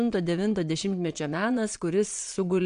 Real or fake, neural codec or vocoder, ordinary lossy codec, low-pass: fake; vocoder, 22.05 kHz, 80 mel bands, Vocos; MP3, 64 kbps; 9.9 kHz